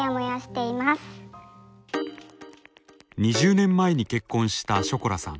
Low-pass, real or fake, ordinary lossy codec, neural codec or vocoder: none; real; none; none